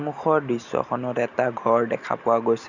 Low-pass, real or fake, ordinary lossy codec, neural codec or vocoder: 7.2 kHz; real; none; none